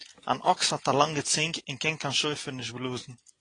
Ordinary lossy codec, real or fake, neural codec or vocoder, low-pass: AAC, 32 kbps; real; none; 9.9 kHz